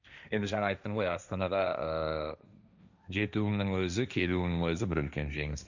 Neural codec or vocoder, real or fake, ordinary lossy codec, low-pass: codec, 16 kHz, 1.1 kbps, Voila-Tokenizer; fake; none; 7.2 kHz